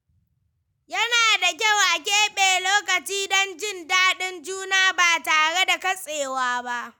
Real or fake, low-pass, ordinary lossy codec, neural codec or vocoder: real; 19.8 kHz; none; none